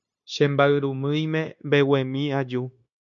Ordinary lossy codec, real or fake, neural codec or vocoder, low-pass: MP3, 48 kbps; fake; codec, 16 kHz, 0.9 kbps, LongCat-Audio-Codec; 7.2 kHz